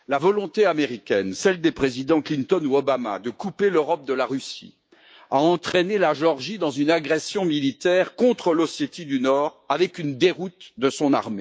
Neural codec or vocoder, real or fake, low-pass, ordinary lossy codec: codec, 16 kHz, 6 kbps, DAC; fake; none; none